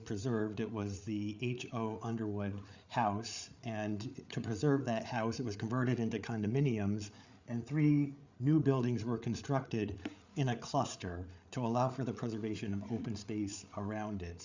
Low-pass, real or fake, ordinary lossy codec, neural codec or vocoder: 7.2 kHz; fake; Opus, 64 kbps; codec, 16 kHz, 8 kbps, FreqCodec, larger model